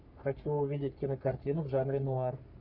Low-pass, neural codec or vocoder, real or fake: 5.4 kHz; codec, 44.1 kHz, 3.4 kbps, Pupu-Codec; fake